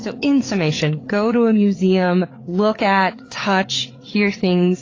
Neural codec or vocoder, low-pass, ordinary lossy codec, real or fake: codec, 16 kHz, 4 kbps, FreqCodec, larger model; 7.2 kHz; AAC, 32 kbps; fake